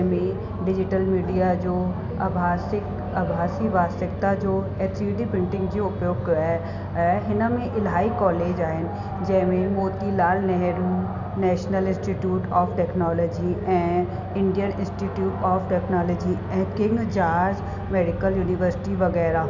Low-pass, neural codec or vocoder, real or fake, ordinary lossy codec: 7.2 kHz; none; real; none